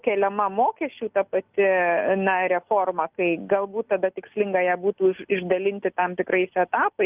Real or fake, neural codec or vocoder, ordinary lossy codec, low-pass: real; none; Opus, 24 kbps; 3.6 kHz